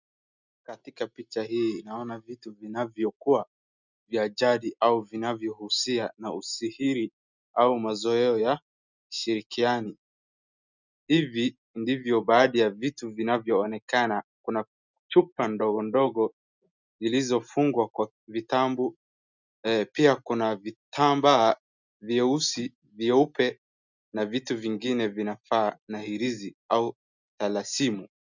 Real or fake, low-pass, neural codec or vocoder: real; 7.2 kHz; none